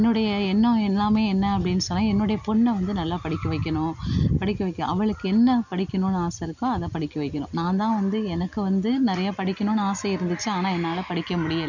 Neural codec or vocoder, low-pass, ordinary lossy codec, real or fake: none; 7.2 kHz; none; real